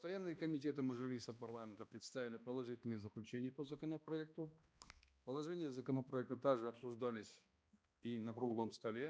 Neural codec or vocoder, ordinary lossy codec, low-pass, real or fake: codec, 16 kHz, 1 kbps, X-Codec, HuBERT features, trained on balanced general audio; none; none; fake